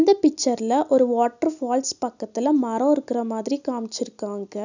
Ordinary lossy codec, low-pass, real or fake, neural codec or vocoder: none; 7.2 kHz; real; none